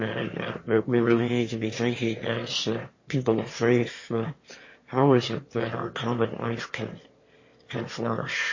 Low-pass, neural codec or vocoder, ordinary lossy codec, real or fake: 7.2 kHz; autoencoder, 22.05 kHz, a latent of 192 numbers a frame, VITS, trained on one speaker; MP3, 32 kbps; fake